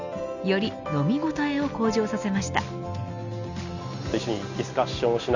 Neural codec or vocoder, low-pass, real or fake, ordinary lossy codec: none; 7.2 kHz; real; none